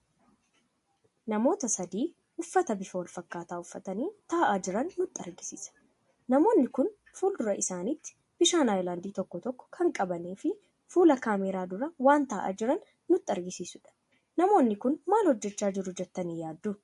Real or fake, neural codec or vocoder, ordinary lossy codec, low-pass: real; none; MP3, 48 kbps; 14.4 kHz